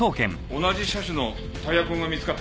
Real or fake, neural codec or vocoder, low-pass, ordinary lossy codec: real; none; none; none